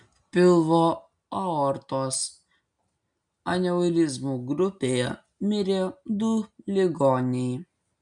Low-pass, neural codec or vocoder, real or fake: 9.9 kHz; none; real